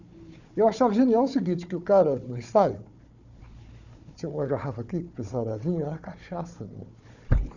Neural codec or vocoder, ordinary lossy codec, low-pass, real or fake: codec, 16 kHz, 4 kbps, FunCodec, trained on Chinese and English, 50 frames a second; none; 7.2 kHz; fake